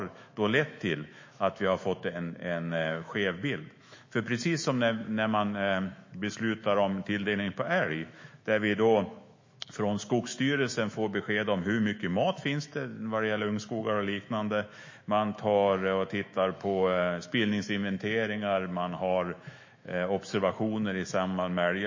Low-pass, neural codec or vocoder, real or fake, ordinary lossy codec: 7.2 kHz; autoencoder, 48 kHz, 128 numbers a frame, DAC-VAE, trained on Japanese speech; fake; MP3, 32 kbps